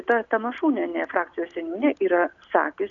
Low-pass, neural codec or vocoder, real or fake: 7.2 kHz; none; real